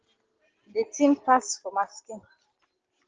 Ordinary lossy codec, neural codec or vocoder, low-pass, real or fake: Opus, 16 kbps; none; 7.2 kHz; real